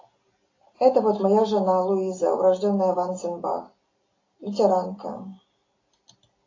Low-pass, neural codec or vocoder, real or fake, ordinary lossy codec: 7.2 kHz; none; real; AAC, 32 kbps